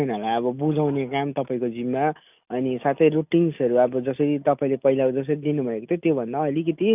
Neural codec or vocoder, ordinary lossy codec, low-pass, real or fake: none; none; 3.6 kHz; real